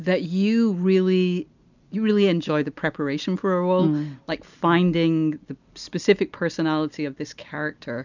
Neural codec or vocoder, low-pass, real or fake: none; 7.2 kHz; real